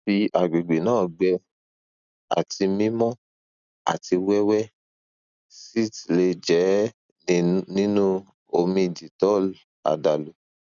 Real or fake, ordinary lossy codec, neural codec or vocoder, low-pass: real; none; none; 7.2 kHz